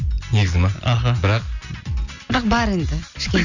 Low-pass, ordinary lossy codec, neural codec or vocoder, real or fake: 7.2 kHz; none; none; real